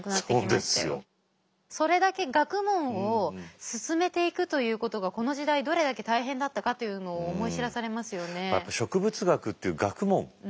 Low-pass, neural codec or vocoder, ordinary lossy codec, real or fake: none; none; none; real